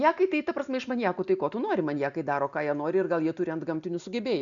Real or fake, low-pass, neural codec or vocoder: real; 7.2 kHz; none